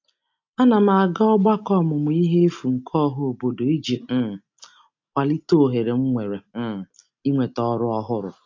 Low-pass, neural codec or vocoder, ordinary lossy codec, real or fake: 7.2 kHz; none; AAC, 48 kbps; real